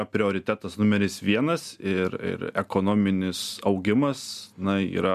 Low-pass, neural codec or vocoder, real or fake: 14.4 kHz; none; real